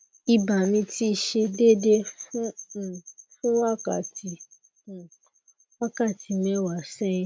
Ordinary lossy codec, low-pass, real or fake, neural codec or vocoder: none; none; real; none